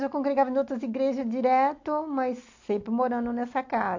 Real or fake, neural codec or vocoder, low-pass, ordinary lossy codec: real; none; 7.2 kHz; AAC, 48 kbps